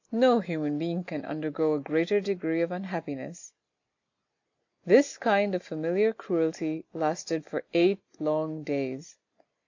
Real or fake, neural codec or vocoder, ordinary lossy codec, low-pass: real; none; AAC, 48 kbps; 7.2 kHz